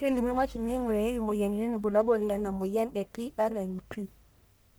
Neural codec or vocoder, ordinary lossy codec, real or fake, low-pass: codec, 44.1 kHz, 1.7 kbps, Pupu-Codec; none; fake; none